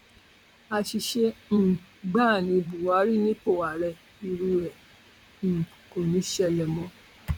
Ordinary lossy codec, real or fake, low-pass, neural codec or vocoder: none; fake; 19.8 kHz; vocoder, 44.1 kHz, 128 mel bands, Pupu-Vocoder